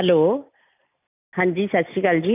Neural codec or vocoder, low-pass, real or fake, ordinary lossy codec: none; 3.6 kHz; real; none